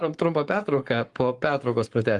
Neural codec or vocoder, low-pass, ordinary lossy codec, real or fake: codec, 44.1 kHz, 7.8 kbps, Pupu-Codec; 10.8 kHz; Opus, 24 kbps; fake